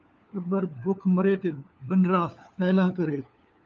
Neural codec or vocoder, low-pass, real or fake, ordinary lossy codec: codec, 16 kHz, 4 kbps, FunCodec, trained on LibriTTS, 50 frames a second; 7.2 kHz; fake; Opus, 24 kbps